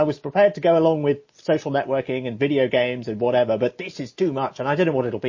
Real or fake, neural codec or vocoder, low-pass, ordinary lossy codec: real; none; 7.2 kHz; MP3, 32 kbps